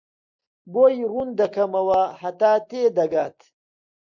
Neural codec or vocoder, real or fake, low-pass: none; real; 7.2 kHz